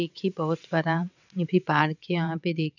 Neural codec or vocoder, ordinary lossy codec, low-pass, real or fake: vocoder, 22.05 kHz, 80 mel bands, WaveNeXt; none; 7.2 kHz; fake